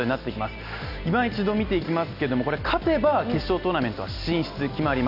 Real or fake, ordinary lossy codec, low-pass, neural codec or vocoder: real; none; 5.4 kHz; none